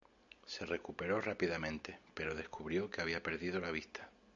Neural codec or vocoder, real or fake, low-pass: none; real; 7.2 kHz